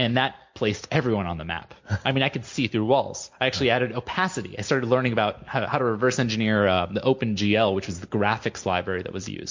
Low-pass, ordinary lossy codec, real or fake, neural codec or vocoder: 7.2 kHz; MP3, 48 kbps; real; none